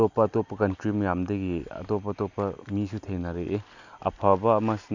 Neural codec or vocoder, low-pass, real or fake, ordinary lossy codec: none; 7.2 kHz; real; none